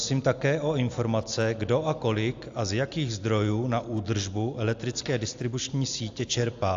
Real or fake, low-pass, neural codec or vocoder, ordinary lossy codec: real; 7.2 kHz; none; AAC, 64 kbps